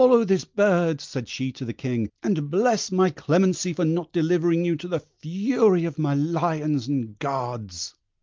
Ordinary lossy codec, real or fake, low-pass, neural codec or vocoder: Opus, 32 kbps; real; 7.2 kHz; none